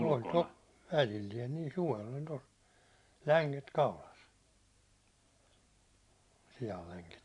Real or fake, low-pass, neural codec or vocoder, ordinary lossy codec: fake; 10.8 kHz; vocoder, 44.1 kHz, 128 mel bands every 512 samples, BigVGAN v2; AAC, 64 kbps